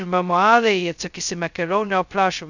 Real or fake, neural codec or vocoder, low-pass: fake; codec, 16 kHz, 0.2 kbps, FocalCodec; 7.2 kHz